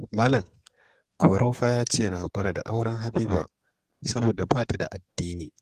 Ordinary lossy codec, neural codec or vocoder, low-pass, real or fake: Opus, 24 kbps; codec, 32 kHz, 1.9 kbps, SNAC; 14.4 kHz; fake